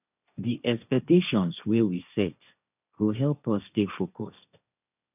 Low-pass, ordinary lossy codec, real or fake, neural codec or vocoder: 3.6 kHz; none; fake; codec, 16 kHz, 1.1 kbps, Voila-Tokenizer